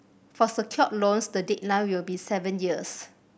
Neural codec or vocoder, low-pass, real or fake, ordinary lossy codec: none; none; real; none